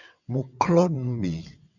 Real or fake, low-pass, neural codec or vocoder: fake; 7.2 kHz; vocoder, 22.05 kHz, 80 mel bands, WaveNeXt